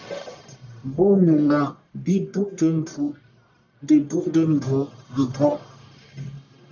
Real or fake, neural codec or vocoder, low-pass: fake; codec, 44.1 kHz, 1.7 kbps, Pupu-Codec; 7.2 kHz